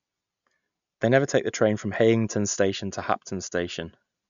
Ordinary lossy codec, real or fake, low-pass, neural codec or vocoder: none; real; 7.2 kHz; none